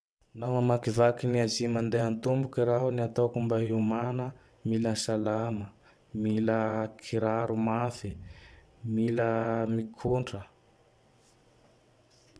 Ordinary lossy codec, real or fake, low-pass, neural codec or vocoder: none; fake; none; vocoder, 22.05 kHz, 80 mel bands, WaveNeXt